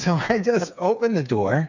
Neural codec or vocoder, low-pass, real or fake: codec, 16 kHz, 4 kbps, FreqCodec, smaller model; 7.2 kHz; fake